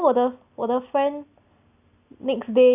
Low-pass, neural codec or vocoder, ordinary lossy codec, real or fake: 3.6 kHz; none; none; real